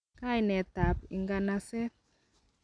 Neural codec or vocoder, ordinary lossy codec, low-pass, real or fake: none; none; 9.9 kHz; real